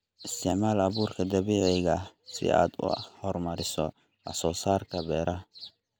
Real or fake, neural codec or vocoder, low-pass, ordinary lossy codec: real; none; none; none